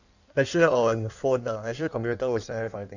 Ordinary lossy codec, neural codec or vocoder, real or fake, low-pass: none; codec, 16 kHz in and 24 kHz out, 1.1 kbps, FireRedTTS-2 codec; fake; 7.2 kHz